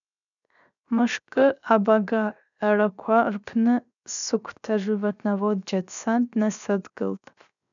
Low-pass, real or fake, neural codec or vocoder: 7.2 kHz; fake; codec, 16 kHz, 0.7 kbps, FocalCodec